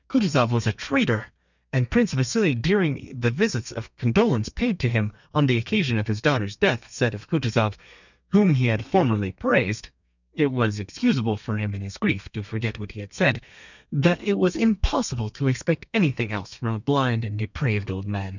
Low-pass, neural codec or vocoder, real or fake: 7.2 kHz; codec, 32 kHz, 1.9 kbps, SNAC; fake